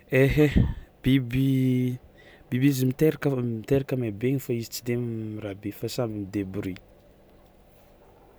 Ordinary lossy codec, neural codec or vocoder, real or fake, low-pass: none; none; real; none